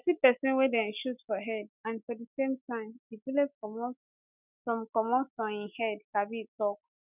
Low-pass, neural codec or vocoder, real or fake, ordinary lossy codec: 3.6 kHz; none; real; none